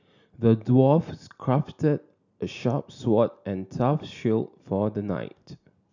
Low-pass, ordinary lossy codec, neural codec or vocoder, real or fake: 7.2 kHz; none; none; real